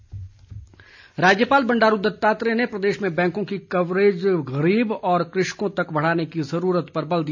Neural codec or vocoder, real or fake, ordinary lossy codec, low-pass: none; real; none; 7.2 kHz